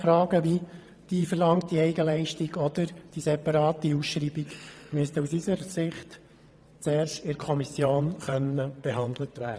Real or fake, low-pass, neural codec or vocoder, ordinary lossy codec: fake; none; vocoder, 22.05 kHz, 80 mel bands, WaveNeXt; none